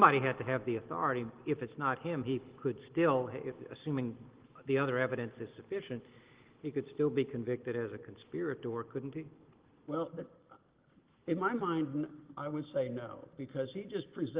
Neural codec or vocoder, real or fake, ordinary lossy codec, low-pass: none; real; Opus, 16 kbps; 3.6 kHz